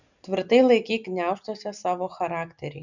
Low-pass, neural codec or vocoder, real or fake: 7.2 kHz; none; real